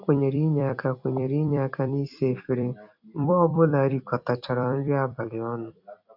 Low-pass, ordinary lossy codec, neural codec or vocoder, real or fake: 5.4 kHz; AAC, 48 kbps; vocoder, 44.1 kHz, 128 mel bands every 256 samples, BigVGAN v2; fake